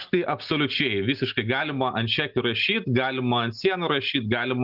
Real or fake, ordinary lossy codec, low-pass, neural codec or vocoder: real; Opus, 32 kbps; 5.4 kHz; none